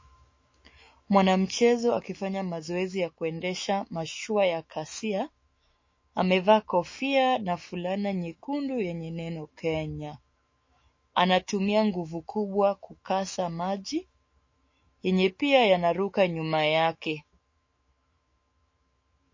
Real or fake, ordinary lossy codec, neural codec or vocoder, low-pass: fake; MP3, 32 kbps; autoencoder, 48 kHz, 128 numbers a frame, DAC-VAE, trained on Japanese speech; 7.2 kHz